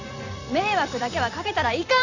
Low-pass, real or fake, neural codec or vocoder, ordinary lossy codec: 7.2 kHz; fake; vocoder, 44.1 kHz, 128 mel bands every 256 samples, BigVGAN v2; none